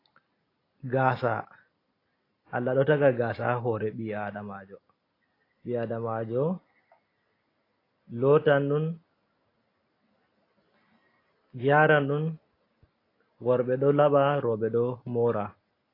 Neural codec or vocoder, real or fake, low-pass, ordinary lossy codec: none; real; 5.4 kHz; AAC, 24 kbps